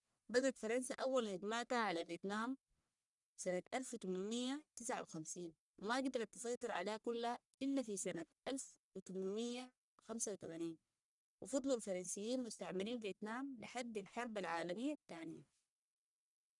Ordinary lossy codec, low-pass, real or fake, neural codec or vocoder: none; 10.8 kHz; fake; codec, 44.1 kHz, 1.7 kbps, Pupu-Codec